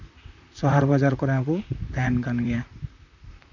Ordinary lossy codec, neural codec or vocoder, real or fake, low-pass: none; vocoder, 44.1 kHz, 128 mel bands, Pupu-Vocoder; fake; 7.2 kHz